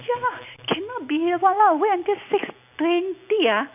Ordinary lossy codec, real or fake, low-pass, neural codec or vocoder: none; real; 3.6 kHz; none